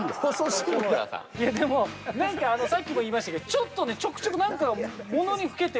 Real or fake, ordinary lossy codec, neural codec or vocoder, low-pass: real; none; none; none